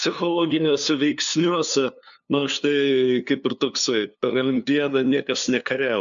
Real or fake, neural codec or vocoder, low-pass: fake; codec, 16 kHz, 2 kbps, FunCodec, trained on LibriTTS, 25 frames a second; 7.2 kHz